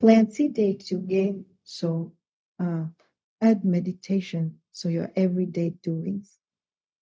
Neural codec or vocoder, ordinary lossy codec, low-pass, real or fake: codec, 16 kHz, 0.4 kbps, LongCat-Audio-Codec; none; none; fake